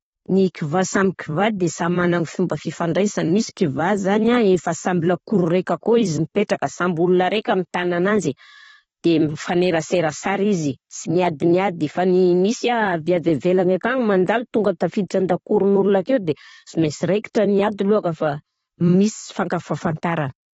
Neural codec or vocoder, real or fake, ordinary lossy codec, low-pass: vocoder, 44.1 kHz, 128 mel bands every 256 samples, BigVGAN v2; fake; AAC, 24 kbps; 19.8 kHz